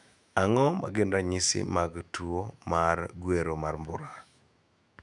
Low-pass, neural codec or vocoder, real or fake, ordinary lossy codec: 10.8 kHz; autoencoder, 48 kHz, 128 numbers a frame, DAC-VAE, trained on Japanese speech; fake; none